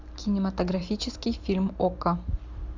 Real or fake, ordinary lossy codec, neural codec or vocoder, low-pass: real; MP3, 64 kbps; none; 7.2 kHz